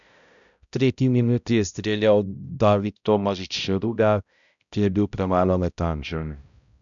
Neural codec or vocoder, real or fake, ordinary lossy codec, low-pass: codec, 16 kHz, 0.5 kbps, X-Codec, HuBERT features, trained on balanced general audio; fake; none; 7.2 kHz